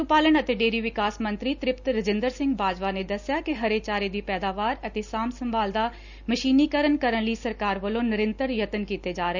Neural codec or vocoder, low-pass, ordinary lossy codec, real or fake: none; 7.2 kHz; none; real